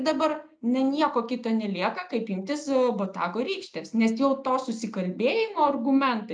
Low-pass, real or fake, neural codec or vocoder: 9.9 kHz; real; none